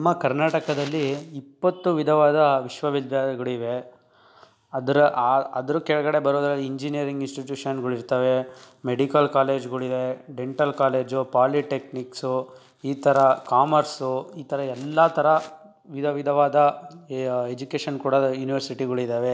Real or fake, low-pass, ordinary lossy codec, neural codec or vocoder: real; none; none; none